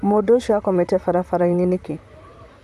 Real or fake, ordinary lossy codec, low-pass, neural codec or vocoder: fake; none; 14.4 kHz; vocoder, 44.1 kHz, 128 mel bands, Pupu-Vocoder